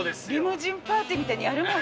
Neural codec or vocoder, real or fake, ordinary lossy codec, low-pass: none; real; none; none